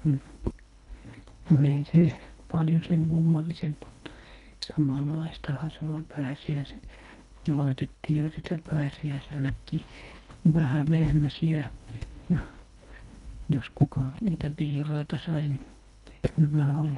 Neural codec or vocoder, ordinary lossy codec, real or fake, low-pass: codec, 24 kHz, 1.5 kbps, HILCodec; none; fake; 10.8 kHz